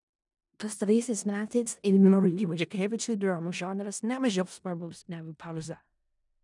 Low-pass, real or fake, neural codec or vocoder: 10.8 kHz; fake; codec, 16 kHz in and 24 kHz out, 0.4 kbps, LongCat-Audio-Codec, four codebook decoder